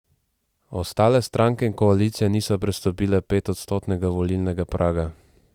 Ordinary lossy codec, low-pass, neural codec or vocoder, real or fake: Opus, 64 kbps; 19.8 kHz; vocoder, 44.1 kHz, 128 mel bands every 256 samples, BigVGAN v2; fake